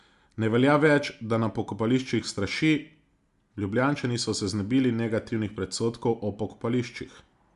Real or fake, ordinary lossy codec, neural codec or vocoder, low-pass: real; Opus, 64 kbps; none; 10.8 kHz